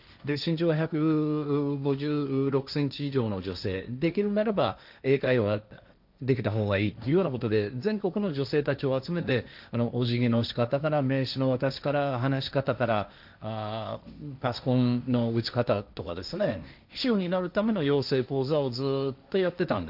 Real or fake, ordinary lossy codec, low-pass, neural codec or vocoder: fake; none; 5.4 kHz; codec, 16 kHz, 1.1 kbps, Voila-Tokenizer